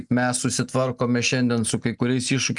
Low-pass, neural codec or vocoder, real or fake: 10.8 kHz; none; real